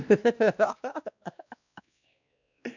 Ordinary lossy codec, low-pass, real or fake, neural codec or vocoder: none; 7.2 kHz; fake; codec, 16 kHz, 1 kbps, X-Codec, WavLM features, trained on Multilingual LibriSpeech